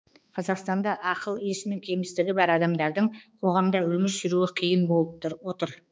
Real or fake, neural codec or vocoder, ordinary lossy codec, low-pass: fake; codec, 16 kHz, 2 kbps, X-Codec, HuBERT features, trained on balanced general audio; none; none